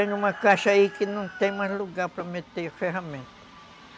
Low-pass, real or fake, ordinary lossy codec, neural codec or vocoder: none; real; none; none